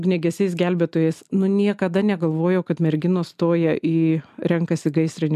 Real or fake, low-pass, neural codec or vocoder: real; 14.4 kHz; none